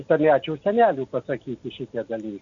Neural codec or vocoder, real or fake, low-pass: none; real; 7.2 kHz